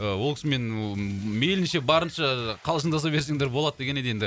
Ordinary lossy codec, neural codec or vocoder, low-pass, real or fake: none; none; none; real